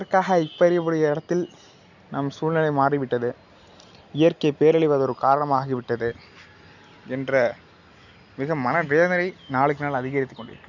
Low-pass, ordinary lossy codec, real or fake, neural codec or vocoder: 7.2 kHz; none; real; none